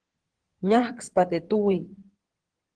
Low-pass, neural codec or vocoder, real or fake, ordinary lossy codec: 9.9 kHz; codec, 16 kHz in and 24 kHz out, 2.2 kbps, FireRedTTS-2 codec; fake; Opus, 16 kbps